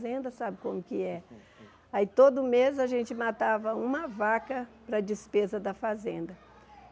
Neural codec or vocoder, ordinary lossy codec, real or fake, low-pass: none; none; real; none